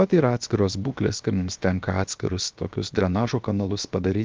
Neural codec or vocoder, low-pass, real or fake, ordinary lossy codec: codec, 16 kHz, 0.7 kbps, FocalCodec; 7.2 kHz; fake; Opus, 32 kbps